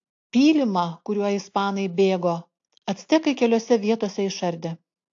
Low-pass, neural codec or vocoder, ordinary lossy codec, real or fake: 7.2 kHz; none; AAC, 48 kbps; real